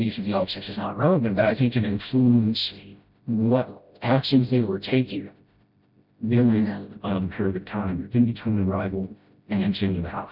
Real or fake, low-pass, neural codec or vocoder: fake; 5.4 kHz; codec, 16 kHz, 0.5 kbps, FreqCodec, smaller model